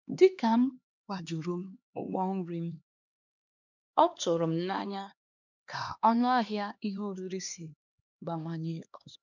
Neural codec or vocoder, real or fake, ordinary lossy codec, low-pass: codec, 16 kHz, 2 kbps, X-Codec, HuBERT features, trained on LibriSpeech; fake; none; 7.2 kHz